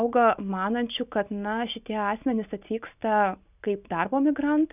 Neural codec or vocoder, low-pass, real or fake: none; 3.6 kHz; real